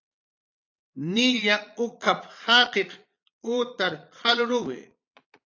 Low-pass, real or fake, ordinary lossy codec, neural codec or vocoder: 7.2 kHz; fake; AAC, 48 kbps; vocoder, 22.05 kHz, 80 mel bands, Vocos